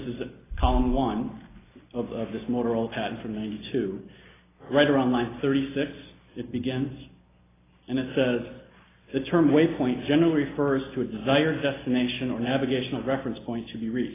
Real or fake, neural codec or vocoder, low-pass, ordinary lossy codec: real; none; 3.6 kHz; AAC, 16 kbps